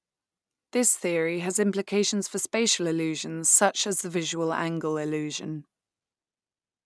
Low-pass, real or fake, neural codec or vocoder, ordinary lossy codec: none; real; none; none